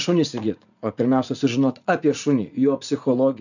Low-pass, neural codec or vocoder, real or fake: 7.2 kHz; vocoder, 44.1 kHz, 80 mel bands, Vocos; fake